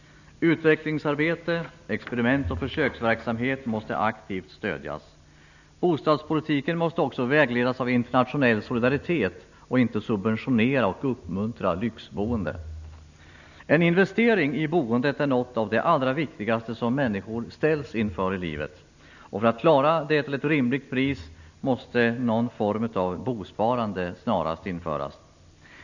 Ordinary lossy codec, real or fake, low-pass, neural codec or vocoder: none; real; 7.2 kHz; none